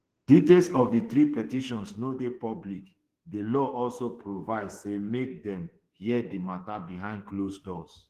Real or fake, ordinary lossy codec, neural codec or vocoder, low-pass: fake; Opus, 16 kbps; autoencoder, 48 kHz, 32 numbers a frame, DAC-VAE, trained on Japanese speech; 14.4 kHz